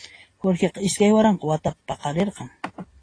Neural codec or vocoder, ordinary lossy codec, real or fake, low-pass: none; AAC, 32 kbps; real; 9.9 kHz